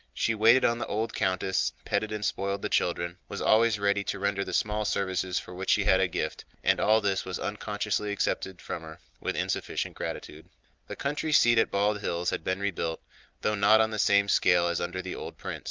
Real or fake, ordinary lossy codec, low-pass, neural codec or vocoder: real; Opus, 24 kbps; 7.2 kHz; none